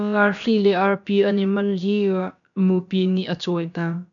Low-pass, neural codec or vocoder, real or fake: 7.2 kHz; codec, 16 kHz, about 1 kbps, DyCAST, with the encoder's durations; fake